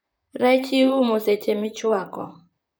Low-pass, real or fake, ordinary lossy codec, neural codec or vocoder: none; fake; none; vocoder, 44.1 kHz, 128 mel bands, Pupu-Vocoder